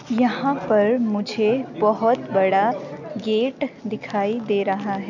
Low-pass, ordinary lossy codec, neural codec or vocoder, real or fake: 7.2 kHz; none; none; real